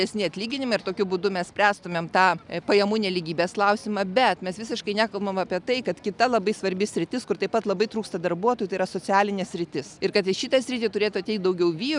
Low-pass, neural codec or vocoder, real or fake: 10.8 kHz; none; real